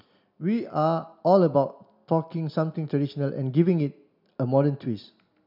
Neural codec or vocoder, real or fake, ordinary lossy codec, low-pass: none; real; none; 5.4 kHz